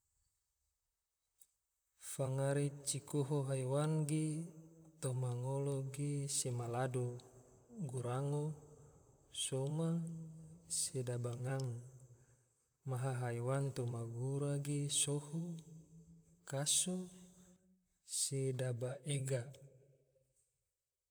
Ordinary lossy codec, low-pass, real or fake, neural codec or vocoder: none; none; fake; vocoder, 44.1 kHz, 128 mel bands, Pupu-Vocoder